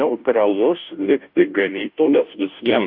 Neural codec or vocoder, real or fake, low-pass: codec, 16 kHz, 0.5 kbps, FunCodec, trained on Chinese and English, 25 frames a second; fake; 5.4 kHz